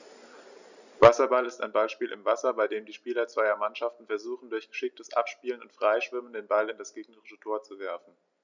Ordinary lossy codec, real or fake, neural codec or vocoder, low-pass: none; real; none; 7.2 kHz